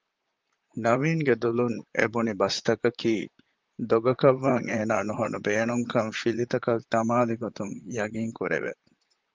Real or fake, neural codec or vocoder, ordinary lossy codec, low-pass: fake; vocoder, 44.1 kHz, 128 mel bands, Pupu-Vocoder; Opus, 32 kbps; 7.2 kHz